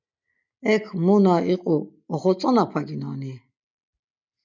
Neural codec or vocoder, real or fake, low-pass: none; real; 7.2 kHz